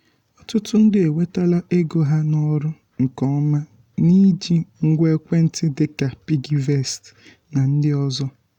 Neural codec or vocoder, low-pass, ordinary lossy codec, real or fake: none; 19.8 kHz; none; real